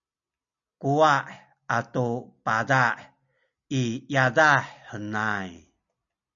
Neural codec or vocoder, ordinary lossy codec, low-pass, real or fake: none; MP3, 96 kbps; 7.2 kHz; real